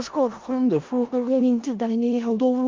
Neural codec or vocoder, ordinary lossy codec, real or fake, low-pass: codec, 16 kHz in and 24 kHz out, 0.4 kbps, LongCat-Audio-Codec, four codebook decoder; Opus, 32 kbps; fake; 7.2 kHz